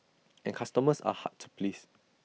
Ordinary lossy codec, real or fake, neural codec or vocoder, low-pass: none; real; none; none